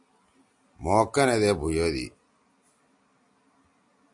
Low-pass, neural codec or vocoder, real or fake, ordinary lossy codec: 10.8 kHz; none; real; AAC, 64 kbps